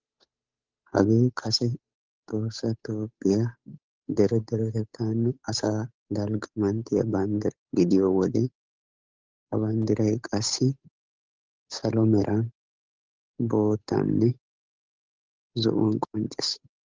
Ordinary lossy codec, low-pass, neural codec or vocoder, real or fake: Opus, 32 kbps; 7.2 kHz; codec, 16 kHz, 8 kbps, FunCodec, trained on Chinese and English, 25 frames a second; fake